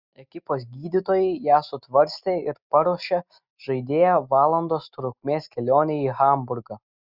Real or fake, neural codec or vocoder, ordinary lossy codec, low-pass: real; none; Opus, 64 kbps; 5.4 kHz